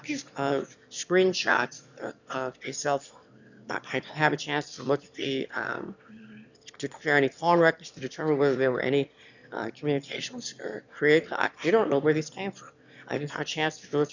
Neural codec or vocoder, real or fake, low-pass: autoencoder, 22.05 kHz, a latent of 192 numbers a frame, VITS, trained on one speaker; fake; 7.2 kHz